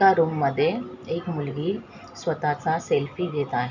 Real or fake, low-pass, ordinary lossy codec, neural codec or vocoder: real; 7.2 kHz; none; none